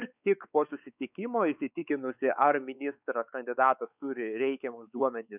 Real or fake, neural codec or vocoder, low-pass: fake; codec, 16 kHz, 4 kbps, X-Codec, WavLM features, trained on Multilingual LibriSpeech; 3.6 kHz